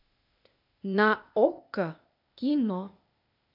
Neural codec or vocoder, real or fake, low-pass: codec, 16 kHz, 0.8 kbps, ZipCodec; fake; 5.4 kHz